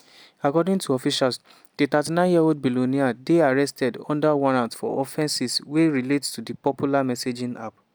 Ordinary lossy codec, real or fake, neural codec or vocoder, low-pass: none; real; none; none